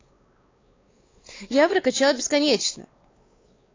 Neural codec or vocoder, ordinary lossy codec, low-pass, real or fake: codec, 16 kHz, 4 kbps, X-Codec, WavLM features, trained on Multilingual LibriSpeech; AAC, 32 kbps; 7.2 kHz; fake